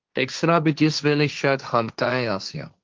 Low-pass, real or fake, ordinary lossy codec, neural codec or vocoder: 7.2 kHz; fake; Opus, 24 kbps; codec, 16 kHz, 1.1 kbps, Voila-Tokenizer